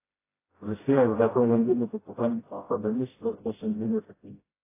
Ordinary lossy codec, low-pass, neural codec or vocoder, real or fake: AAC, 16 kbps; 3.6 kHz; codec, 16 kHz, 0.5 kbps, FreqCodec, smaller model; fake